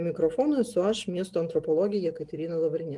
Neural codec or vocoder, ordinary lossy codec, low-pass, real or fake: none; Opus, 24 kbps; 10.8 kHz; real